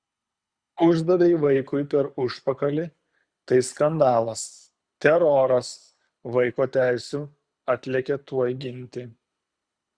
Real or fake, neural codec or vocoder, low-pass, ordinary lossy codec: fake; codec, 24 kHz, 6 kbps, HILCodec; 9.9 kHz; Opus, 64 kbps